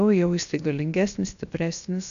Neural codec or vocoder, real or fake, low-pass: codec, 16 kHz, 0.7 kbps, FocalCodec; fake; 7.2 kHz